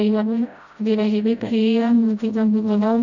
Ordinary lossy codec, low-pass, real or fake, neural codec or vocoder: none; 7.2 kHz; fake; codec, 16 kHz, 0.5 kbps, FreqCodec, smaller model